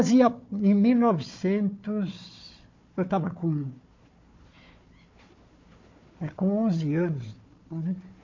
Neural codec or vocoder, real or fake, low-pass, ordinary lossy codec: codec, 16 kHz, 4 kbps, FunCodec, trained on Chinese and English, 50 frames a second; fake; 7.2 kHz; MP3, 48 kbps